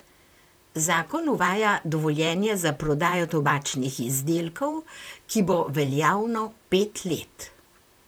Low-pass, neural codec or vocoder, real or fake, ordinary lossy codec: none; vocoder, 44.1 kHz, 128 mel bands, Pupu-Vocoder; fake; none